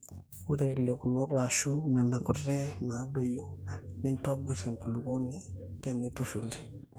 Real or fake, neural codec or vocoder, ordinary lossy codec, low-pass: fake; codec, 44.1 kHz, 2.6 kbps, DAC; none; none